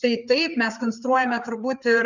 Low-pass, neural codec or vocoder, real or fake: 7.2 kHz; codec, 16 kHz, 4 kbps, FreqCodec, larger model; fake